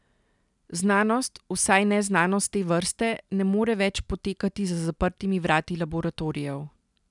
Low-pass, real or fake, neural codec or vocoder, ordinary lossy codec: 10.8 kHz; real; none; none